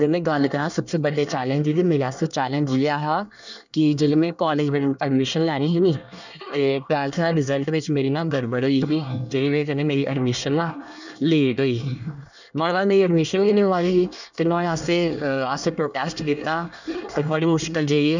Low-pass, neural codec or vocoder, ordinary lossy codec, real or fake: 7.2 kHz; codec, 24 kHz, 1 kbps, SNAC; none; fake